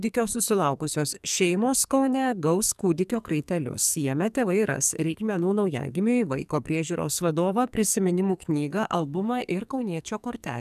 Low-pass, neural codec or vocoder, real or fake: 14.4 kHz; codec, 44.1 kHz, 2.6 kbps, SNAC; fake